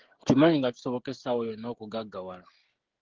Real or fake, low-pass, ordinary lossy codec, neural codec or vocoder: fake; 7.2 kHz; Opus, 16 kbps; codec, 16 kHz, 16 kbps, FreqCodec, smaller model